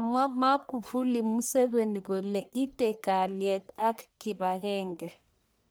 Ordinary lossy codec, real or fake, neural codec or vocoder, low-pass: none; fake; codec, 44.1 kHz, 1.7 kbps, Pupu-Codec; none